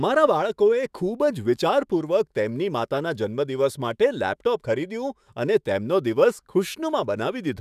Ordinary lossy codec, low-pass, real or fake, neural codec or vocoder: none; 14.4 kHz; fake; autoencoder, 48 kHz, 128 numbers a frame, DAC-VAE, trained on Japanese speech